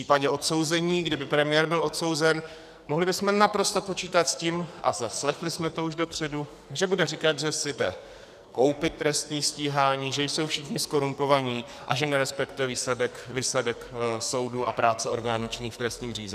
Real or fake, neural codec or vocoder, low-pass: fake; codec, 44.1 kHz, 2.6 kbps, SNAC; 14.4 kHz